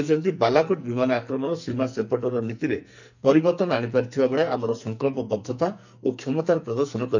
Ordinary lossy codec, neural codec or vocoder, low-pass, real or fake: none; codec, 44.1 kHz, 2.6 kbps, SNAC; 7.2 kHz; fake